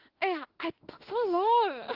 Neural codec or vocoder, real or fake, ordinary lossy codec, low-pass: autoencoder, 48 kHz, 32 numbers a frame, DAC-VAE, trained on Japanese speech; fake; Opus, 16 kbps; 5.4 kHz